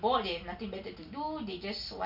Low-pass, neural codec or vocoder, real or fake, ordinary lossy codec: 5.4 kHz; none; real; Opus, 64 kbps